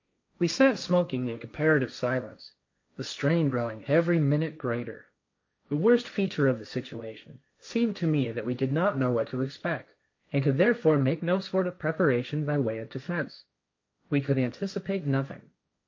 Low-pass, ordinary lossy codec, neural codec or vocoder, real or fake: 7.2 kHz; MP3, 48 kbps; codec, 16 kHz, 1.1 kbps, Voila-Tokenizer; fake